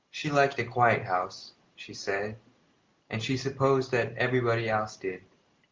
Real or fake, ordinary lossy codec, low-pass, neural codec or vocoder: real; Opus, 24 kbps; 7.2 kHz; none